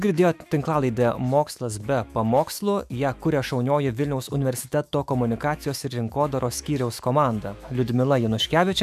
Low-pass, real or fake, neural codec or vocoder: 14.4 kHz; fake; autoencoder, 48 kHz, 128 numbers a frame, DAC-VAE, trained on Japanese speech